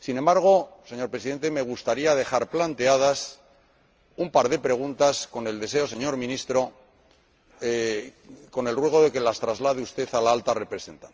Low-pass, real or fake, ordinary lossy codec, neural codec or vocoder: 7.2 kHz; real; Opus, 24 kbps; none